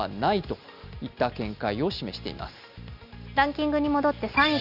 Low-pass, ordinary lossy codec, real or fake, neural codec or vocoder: 5.4 kHz; none; real; none